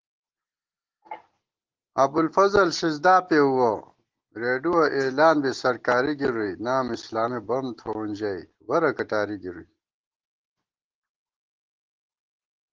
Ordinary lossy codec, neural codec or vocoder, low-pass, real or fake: Opus, 16 kbps; none; 7.2 kHz; real